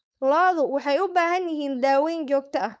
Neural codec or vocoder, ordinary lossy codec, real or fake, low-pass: codec, 16 kHz, 4.8 kbps, FACodec; none; fake; none